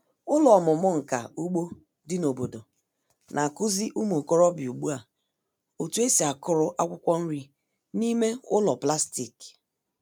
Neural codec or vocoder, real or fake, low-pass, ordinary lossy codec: none; real; none; none